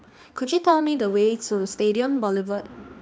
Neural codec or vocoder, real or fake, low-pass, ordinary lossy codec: codec, 16 kHz, 2 kbps, X-Codec, HuBERT features, trained on balanced general audio; fake; none; none